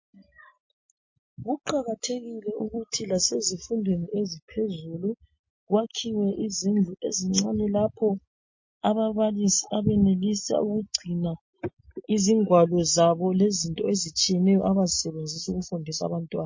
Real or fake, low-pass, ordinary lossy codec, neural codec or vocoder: real; 7.2 kHz; MP3, 32 kbps; none